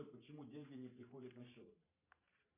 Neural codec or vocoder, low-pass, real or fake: vocoder, 44.1 kHz, 128 mel bands every 512 samples, BigVGAN v2; 3.6 kHz; fake